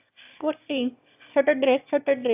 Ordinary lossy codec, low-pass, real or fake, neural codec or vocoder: AAC, 32 kbps; 3.6 kHz; fake; autoencoder, 22.05 kHz, a latent of 192 numbers a frame, VITS, trained on one speaker